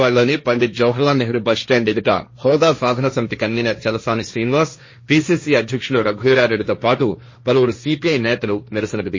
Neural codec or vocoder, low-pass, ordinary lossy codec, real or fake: codec, 16 kHz, 1.1 kbps, Voila-Tokenizer; 7.2 kHz; MP3, 32 kbps; fake